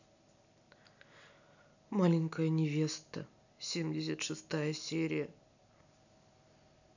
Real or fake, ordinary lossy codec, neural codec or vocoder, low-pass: real; none; none; 7.2 kHz